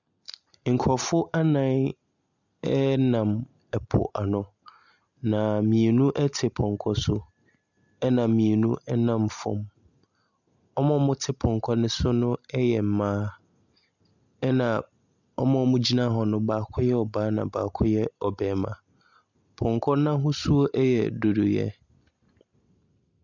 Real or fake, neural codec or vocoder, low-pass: real; none; 7.2 kHz